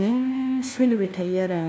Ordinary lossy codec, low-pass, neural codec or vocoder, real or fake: none; none; codec, 16 kHz, 1 kbps, FunCodec, trained on LibriTTS, 50 frames a second; fake